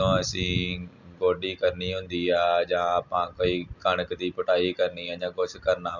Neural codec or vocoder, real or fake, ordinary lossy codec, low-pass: none; real; none; 7.2 kHz